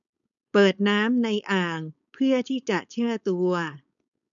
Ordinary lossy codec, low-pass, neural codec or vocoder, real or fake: none; 7.2 kHz; codec, 16 kHz, 4.8 kbps, FACodec; fake